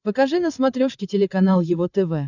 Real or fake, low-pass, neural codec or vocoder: fake; 7.2 kHz; codec, 16 kHz, 16 kbps, FreqCodec, larger model